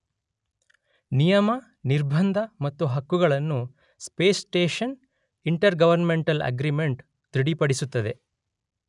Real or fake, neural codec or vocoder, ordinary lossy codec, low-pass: real; none; none; 10.8 kHz